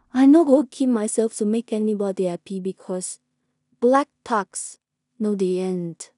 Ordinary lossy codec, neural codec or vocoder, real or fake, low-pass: none; codec, 16 kHz in and 24 kHz out, 0.4 kbps, LongCat-Audio-Codec, two codebook decoder; fake; 10.8 kHz